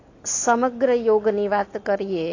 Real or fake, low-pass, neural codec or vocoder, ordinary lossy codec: real; 7.2 kHz; none; AAC, 32 kbps